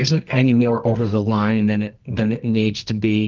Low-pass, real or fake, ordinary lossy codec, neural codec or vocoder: 7.2 kHz; fake; Opus, 32 kbps; codec, 24 kHz, 0.9 kbps, WavTokenizer, medium music audio release